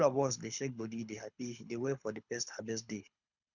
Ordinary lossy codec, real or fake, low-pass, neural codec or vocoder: none; fake; 7.2 kHz; codec, 24 kHz, 6 kbps, HILCodec